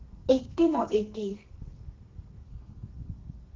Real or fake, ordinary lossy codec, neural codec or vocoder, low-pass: fake; Opus, 16 kbps; codec, 32 kHz, 1.9 kbps, SNAC; 7.2 kHz